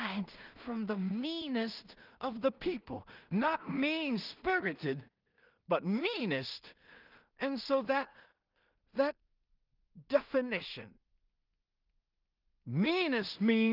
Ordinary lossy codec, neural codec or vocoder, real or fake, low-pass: Opus, 24 kbps; codec, 16 kHz in and 24 kHz out, 0.4 kbps, LongCat-Audio-Codec, two codebook decoder; fake; 5.4 kHz